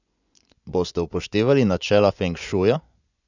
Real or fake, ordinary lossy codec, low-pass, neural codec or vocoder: fake; none; 7.2 kHz; vocoder, 24 kHz, 100 mel bands, Vocos